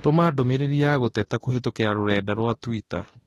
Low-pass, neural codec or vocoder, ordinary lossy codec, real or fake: 19.8 kHz; autoencoder, 48 kHz, 32 numbers a frame, DAC-VAE, trained on Japanese speech; AAC, 32 kbps; fake